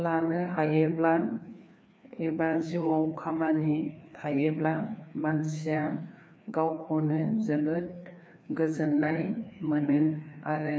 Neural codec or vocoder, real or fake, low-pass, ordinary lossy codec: codec, 16 kHz, 2 kbps, FreqCodec, larger model; fake; none; none